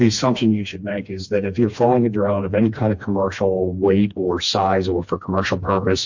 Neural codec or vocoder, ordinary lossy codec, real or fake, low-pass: codec, 16 kHz, 2 kbps, FreqCodec, smaller model; MP3, 48 kbps; fake; 7.2 kHz